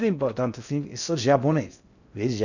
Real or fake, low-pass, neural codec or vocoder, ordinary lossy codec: fake; 7.2 kHz; codec, 16 kHz in and 24 kHz out, 0.6 kbps, FocalCodec, streaming, 4096 codes; none